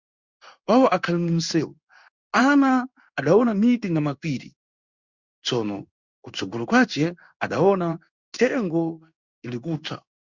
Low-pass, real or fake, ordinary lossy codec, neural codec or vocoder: 7.2 kHz; fake; Opus, 64 kbps; codec, 16 kHz in and 24 kHz out, 1 kbps, XY-Tokenizer